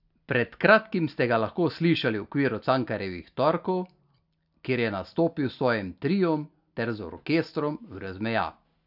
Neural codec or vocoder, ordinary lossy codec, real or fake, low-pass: none; none; real; 5.4 kHz